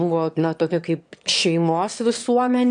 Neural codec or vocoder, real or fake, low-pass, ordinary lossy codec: autoencoder, 22.05 kHz, a latent of 192 numbers a frame, VITS, trained on one speaker; fake; 9.9 kHz; MP3, 64 kbps